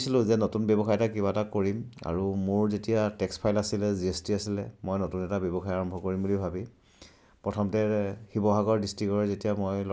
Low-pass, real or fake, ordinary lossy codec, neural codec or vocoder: none; real; none; none